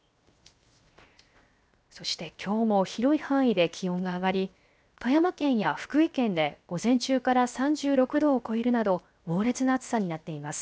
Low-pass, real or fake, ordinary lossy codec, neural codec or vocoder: none; fake; none; codec, 16 kHz, 0.7 kbps, FocalCodec